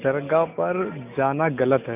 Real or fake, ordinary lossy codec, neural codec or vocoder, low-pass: real; none; none; 3.6 kHz